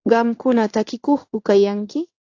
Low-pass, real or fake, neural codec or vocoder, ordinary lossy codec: 7.2 kHz; fake; codec, 16 kHz in and 24 kHz out, 1 kbps, XY-Tokenizer; AAC, 48 kbps